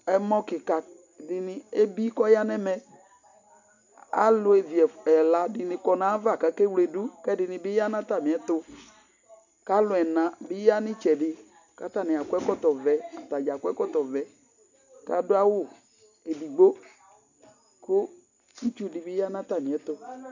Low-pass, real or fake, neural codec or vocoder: 7.2 kHz; real; none